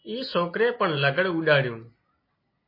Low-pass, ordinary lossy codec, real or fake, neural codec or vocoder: 5.4 kHz; MP3, 24 kbps; real; none